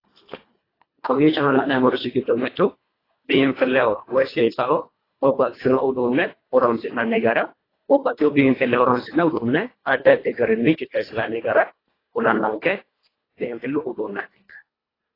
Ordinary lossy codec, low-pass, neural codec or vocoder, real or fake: AAC, 24 kbps; 5.4 kHz; codec, 24 kHz, 1.5 kbps, HILCodec; fake